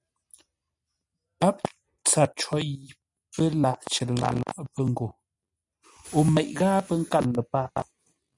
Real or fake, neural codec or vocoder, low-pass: real; none; 10.8 kHz